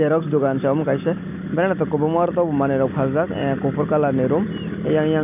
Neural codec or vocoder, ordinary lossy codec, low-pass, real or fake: none; none; 3.6 kHz; real